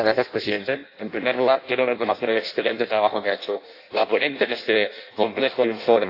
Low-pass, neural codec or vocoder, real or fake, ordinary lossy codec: 5.4 kHz; codec, 16 kHz in and 24 kHz out, 0.6 kbps, FireRedTTS-2 codec; fake; AAC, 32 kbps